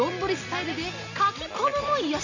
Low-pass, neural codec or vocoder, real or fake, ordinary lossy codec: 7.2 kHz; none; real; none